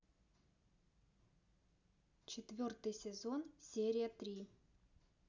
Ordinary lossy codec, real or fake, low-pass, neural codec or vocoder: none; real; 7.2 kHz; none